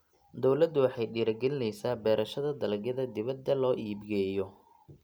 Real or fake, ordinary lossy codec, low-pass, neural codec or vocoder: real; none; none; none